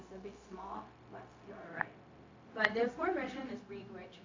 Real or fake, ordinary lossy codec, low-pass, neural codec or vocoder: fake; MP3, 48 kbps; 7.2 kHz; codec, 16 kHz, 0.4 kbps, LongCat-Audio-Codec